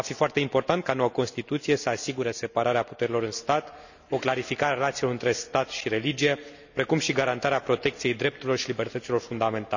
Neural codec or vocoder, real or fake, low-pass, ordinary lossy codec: none; real; 7.2 kHz; none